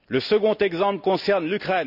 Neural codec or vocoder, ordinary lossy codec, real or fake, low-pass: none; none; real; 5.4 kHz